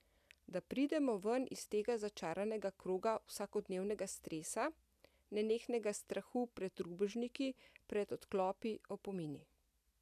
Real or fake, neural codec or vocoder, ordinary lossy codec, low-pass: real; none; none; 14.4 kHz